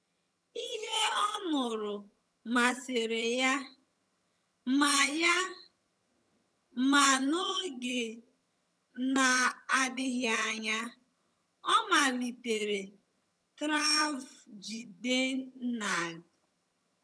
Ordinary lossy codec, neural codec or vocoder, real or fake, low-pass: none; vocoder, 22.05 kHz, 80 mel bands, HiFi-GAN; fake; none